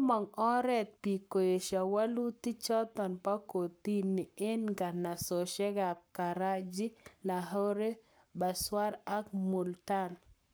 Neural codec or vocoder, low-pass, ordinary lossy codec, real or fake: codec, 44.1 kHz, 7.8 kbps, Pupu-Codec; none; none; fake